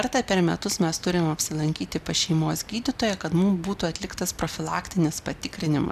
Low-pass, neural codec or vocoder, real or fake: 14.4 kHz; none; real